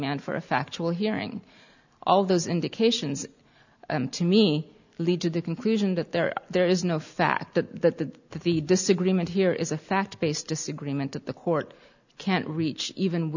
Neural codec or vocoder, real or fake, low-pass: none; real; 7.2 kHz